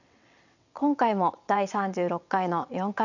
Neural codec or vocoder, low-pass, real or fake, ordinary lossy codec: vocoder, 22.05 kHz, 80 mel bands, WaveNeXt; 7.2 kHz; fake; none